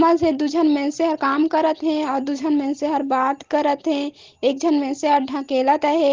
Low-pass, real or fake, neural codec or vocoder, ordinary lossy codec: 7.2 kHz; real; none; Opus, 16 kbps